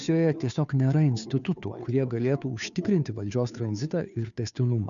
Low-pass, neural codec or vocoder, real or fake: 7.2 kHz; codec, 16 kHz, 2 kbps, FunCodec, trained on Chinese and English, 25 frames a second; fake